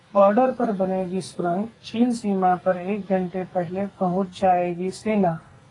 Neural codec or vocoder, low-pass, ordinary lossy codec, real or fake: codec, 44.1 kHz, 2.6 kbps, SNAC; 10.8 kHz; AAC, 32 kbps; fake